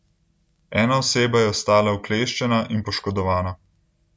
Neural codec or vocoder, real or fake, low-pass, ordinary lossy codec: none; real; none; none